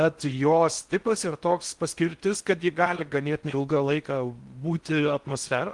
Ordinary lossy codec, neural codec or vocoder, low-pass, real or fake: Opus, 24 kbps; codec, 16 kHz in and 24 kHz out, 0.6 kbps, FocalCodec, streaming, 4096 codes; 10.8 kHz; fake